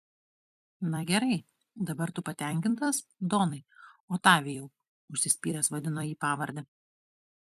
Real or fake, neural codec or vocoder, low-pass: fake; vocoder, 44.1 kHz, 128 mel bands every 256 samples, BigVGAN v2; 14.4 kHz